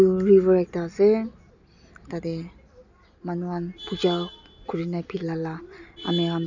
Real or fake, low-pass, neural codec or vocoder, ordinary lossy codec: real; 7.2 kHz; none; none